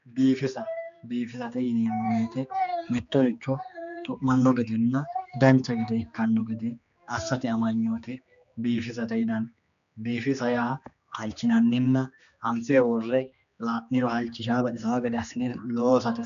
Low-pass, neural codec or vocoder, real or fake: 7.2 kHz; codec, 16 kHz, 2 kbps, X-Codec, HuBERT features, trained on general audio; fake